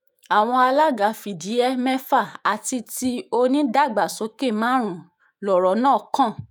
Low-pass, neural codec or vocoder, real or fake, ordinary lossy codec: none; autoencoder, 48 kHz, 128 numbers a frame, DAC-VAE, trained on Japanese speech; fake; none